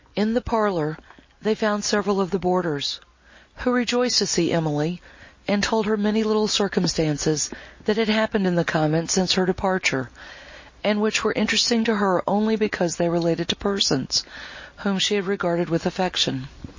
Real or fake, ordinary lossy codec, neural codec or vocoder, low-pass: real; MP3, 32 kbps; none; 7.2 kHz